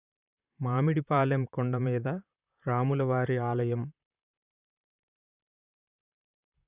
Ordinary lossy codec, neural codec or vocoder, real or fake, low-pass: Opus, 64 kbps; vocoder, 44.1 kHz, 128 mel bands, Pupu-Vocoder; fake; 3.6 kHz